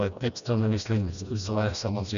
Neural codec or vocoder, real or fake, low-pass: codec, 16 kHz, 1 kbps, FreqCodec, smaller model; fake; 7.2 kHz